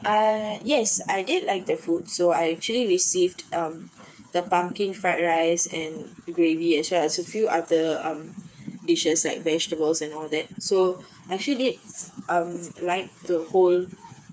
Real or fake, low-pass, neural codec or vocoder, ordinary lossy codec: fake; none; codec, 16 kHz, 4 kbps, FreqCodec, smaller model; none